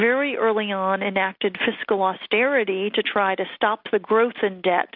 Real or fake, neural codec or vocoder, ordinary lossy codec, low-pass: real; none; MP3, 48 kbps; 5.4 kHz